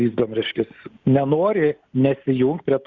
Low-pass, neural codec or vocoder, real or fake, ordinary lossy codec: 7.2 kHz; none; real; Opus, 64 kbps